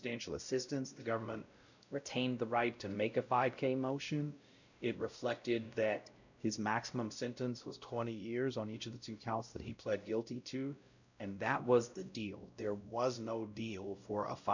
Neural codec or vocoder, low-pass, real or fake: codec, 16 kHz, 0.5 kbps, X-Codec, WavLM features, trained on Multilingual LibriSpeech; 7.2 kHz; fake